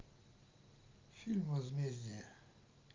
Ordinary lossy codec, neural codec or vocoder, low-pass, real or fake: Opus, 24 kbps; none; 7.2 kHz; real